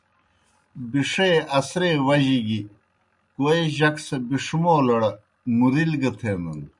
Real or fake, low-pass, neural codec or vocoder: real; 10.8 kHz; none